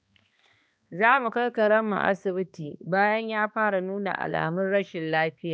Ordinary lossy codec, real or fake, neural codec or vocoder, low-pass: none; fake; codec, 16 kHz, 2 kbps, X-Codec, HuBERT features, trained on balanced general audio; none